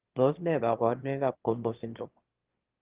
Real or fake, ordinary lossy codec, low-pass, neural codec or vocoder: fake; Opus, 16 kbps; 3.6 kHz; autoencoder, 22.05 kHz, a latent of 192 numbers a frame, VITS, trained on one speaker